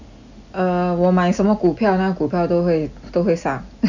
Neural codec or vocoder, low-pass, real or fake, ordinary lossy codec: none; 7.2 kHz; real; none